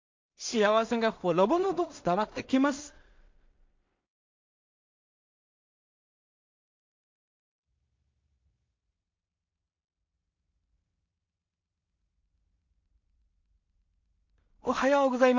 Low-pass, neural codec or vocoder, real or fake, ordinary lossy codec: 7.2 kHz; codec, 16 kHz in and 24 kHz out, 0.4 kbps, LongCat-Audio-Codec, two codebook decoder; fake; MP3, 48 kbps